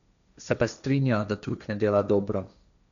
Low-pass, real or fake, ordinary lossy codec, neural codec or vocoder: 7.2 kHz; fake; none; codec, 16 kHz, 1.1 kbps, Voila-Tokenizer